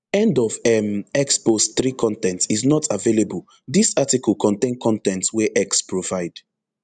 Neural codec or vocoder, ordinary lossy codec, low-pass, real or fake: none; none; 9.9 kHz; real